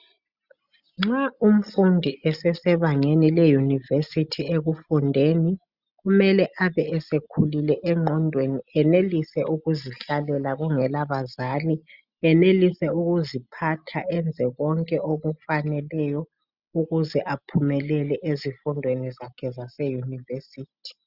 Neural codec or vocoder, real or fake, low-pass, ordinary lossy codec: none; real; 5.4 kHz; Opus, 64 kbps